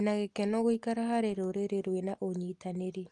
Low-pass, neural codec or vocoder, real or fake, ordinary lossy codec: 10.8 kHz; none; real; Opus, 32 kbps